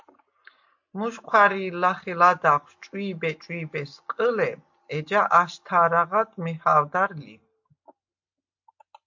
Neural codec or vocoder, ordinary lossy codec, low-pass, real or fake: none; MP3, 48 kbps; 7.2 kHz; real